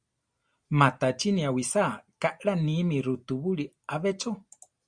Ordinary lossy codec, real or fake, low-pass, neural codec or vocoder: Opus, 64 kbps; real; 9.9 kHz; none